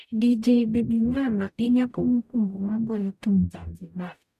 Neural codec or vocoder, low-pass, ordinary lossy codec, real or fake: codec, 44.1 kHz, 0.9 kbps, DAC; 14.4 kHz; none; fake